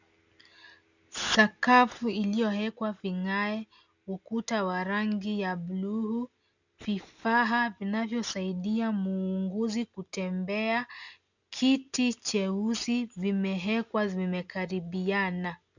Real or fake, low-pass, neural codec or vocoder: real; 7.2 kHz; none